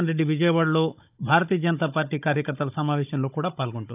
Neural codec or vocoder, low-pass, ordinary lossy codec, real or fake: codec, 16 kHz, 16 kbps, FunCodec, trained on Chinese and English, 50 frames a second; 3.6 kHz; AAC, 32 kbps; fake